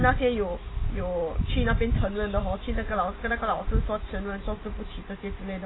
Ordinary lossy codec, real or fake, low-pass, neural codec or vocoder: AAC, 16 kbps; real; 7.2 kHz; none